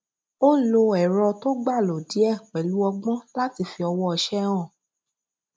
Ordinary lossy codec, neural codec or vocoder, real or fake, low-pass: none; none; real; none